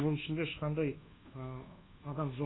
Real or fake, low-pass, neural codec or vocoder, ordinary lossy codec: fake; 7.2 kHz; codec, 24 kHz, 1.2 kbps, DualCodec; AAC, 16 kbps